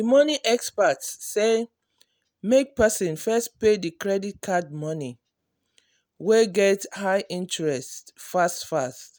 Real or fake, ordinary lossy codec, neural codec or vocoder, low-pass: real; none; none; none